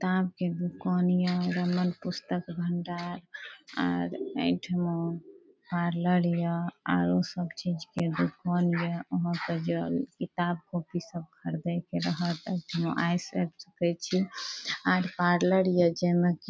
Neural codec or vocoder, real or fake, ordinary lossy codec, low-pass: none; real; none; none